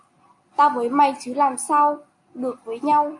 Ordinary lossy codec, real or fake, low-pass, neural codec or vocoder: MP3, 48 kbps; real; 10.8 kHz; none